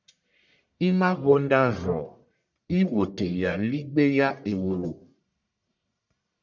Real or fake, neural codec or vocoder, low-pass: fake; codec, 44.1 kHz, 1.7 kbps, Pupu-Codec; 7.2 kHz